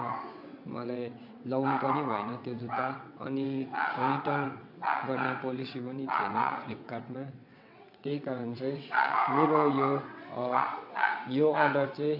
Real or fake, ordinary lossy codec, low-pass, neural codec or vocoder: fake; MP3, 48 kbps; 5.4 kHz; vocoder, 22.05 kHz, 80 mel bands, WaveNeXt